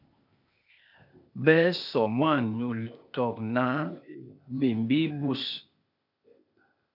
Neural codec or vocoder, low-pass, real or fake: codec, 16 kHz, 0.8 kbps, ZipCodec; 5.4 kHz; fake